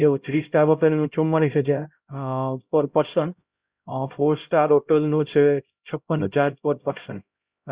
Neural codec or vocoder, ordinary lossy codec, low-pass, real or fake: codec, 16 kHz, 0.5 kbps, X-Codec, HuBERT features, trained on LibriSpeech; Opus, 64 kbps; 3.6 kHz; fake